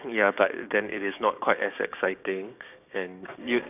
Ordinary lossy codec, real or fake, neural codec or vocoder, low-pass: none; fake; codec, 16 kHz, 6 kbps, DAC; 3.6 kHz